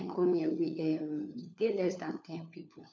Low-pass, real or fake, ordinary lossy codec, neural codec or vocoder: none; fake; none; codec, 16 kHz, 4 kbps, FunCodec, trained on LibriTTS, 50 frames a second